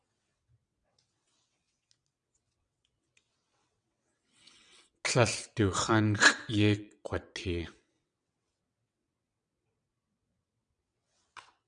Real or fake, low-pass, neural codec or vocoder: fake; 9.9 kHz; vocoder, 22.05 kHz, 80 mel bands, WaveNeXt